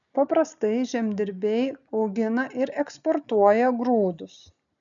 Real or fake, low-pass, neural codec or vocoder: real; 7.2 kHz; none